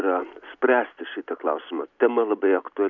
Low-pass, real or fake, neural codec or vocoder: 7.2 kHz; real; none